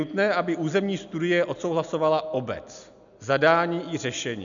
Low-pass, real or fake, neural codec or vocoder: 7.2 kHz; real; none